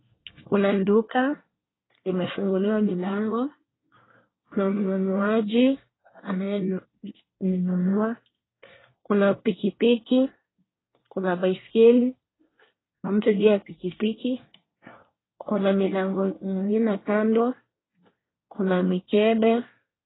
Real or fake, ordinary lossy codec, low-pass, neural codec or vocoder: fake; AAC, 16 kbps; 7.2 kHz; codec, 24 kHz, 1 kbps, SNAC